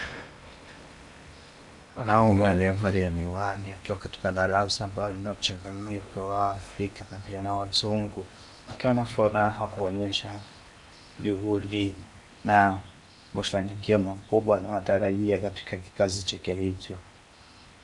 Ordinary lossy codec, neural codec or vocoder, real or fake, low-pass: MP3, 96 kbps; codec, 16 kHz in and 24 kHz out, 0.8 kbps, FocalCodec, streaming, 65536 codes; fake; 10.8 kHz